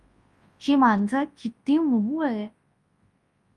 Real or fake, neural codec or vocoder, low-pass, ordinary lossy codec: fake; codec, 24 kHz, 0.9 kbps, WavTokenizer, large speech release; 10.8 kHz; Opus, 32 kbps